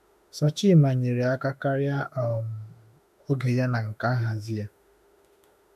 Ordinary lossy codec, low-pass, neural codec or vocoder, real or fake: none; 14.4 kHz; autoencoder, 48 kHz, 32 numbers a frame, DAC-VAE, trained on Japanese speech; fake